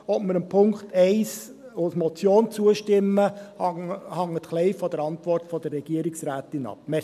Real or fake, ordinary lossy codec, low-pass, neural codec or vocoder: real; MP3, 96 kbps; 14.4 kHz; none